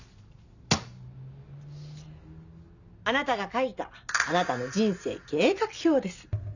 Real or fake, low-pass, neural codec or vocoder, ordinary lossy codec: real; 7.2 kHz; none; none